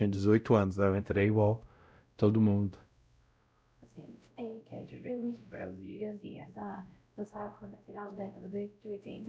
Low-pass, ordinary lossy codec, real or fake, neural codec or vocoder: none; none; fake; codec, 16 kHz, 0.5 kbps, X-Codec, WavLM features, trained on Multilingual LibriSpeech